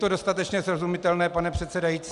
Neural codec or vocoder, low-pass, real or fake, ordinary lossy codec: none; 10.8 kHz; real; Opus, 64 kbps